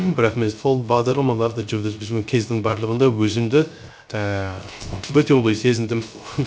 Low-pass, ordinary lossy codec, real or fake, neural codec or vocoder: none; none; fake; codec, 16 kHz, 0.3 kbps, FocalCodec